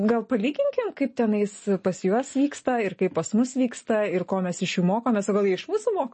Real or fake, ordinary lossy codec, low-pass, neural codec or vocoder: real; MP3, 32 kbps; 10.8 kHz; none